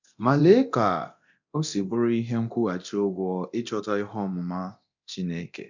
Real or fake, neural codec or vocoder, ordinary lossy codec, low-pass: fake; codec, 24 kHz, 0.9 kbps, DualCodec; none; 7.2 kHz